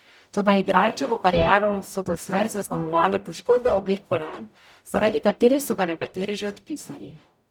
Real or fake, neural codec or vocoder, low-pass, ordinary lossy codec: fake; codec, 44.1 kHz, 0.9 kbps, DAC; 19.8 kHz; none